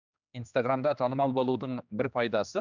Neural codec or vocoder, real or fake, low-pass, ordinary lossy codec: codec, 16 kHz, 2 kbps, X-Codec, HuBERT features, trained on general audio; fake; 7.2 kHz; none